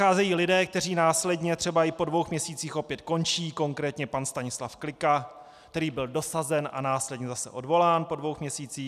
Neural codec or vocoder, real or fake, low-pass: none; real; 14.4 kHz